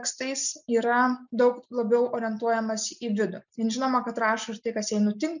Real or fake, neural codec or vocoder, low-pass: real; none; 7.2 kHz